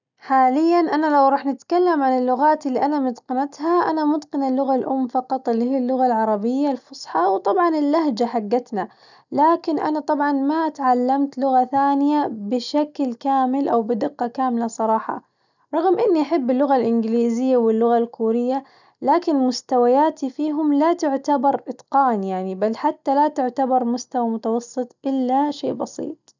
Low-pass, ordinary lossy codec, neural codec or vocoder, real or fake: 7.2 kHz; none; none; real